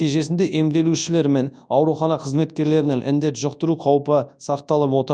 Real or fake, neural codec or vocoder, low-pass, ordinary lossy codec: fake; codec, 24 kHz, 0.9 kbps, WavTokenizer, large speech release; 9.9 kHz; none